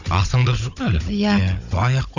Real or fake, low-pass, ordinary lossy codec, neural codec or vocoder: fake; 7.2 kHz; none; codec, 16 kHz, 16 kbps, FunCodec, trained on Chinese and English, 50 frames a second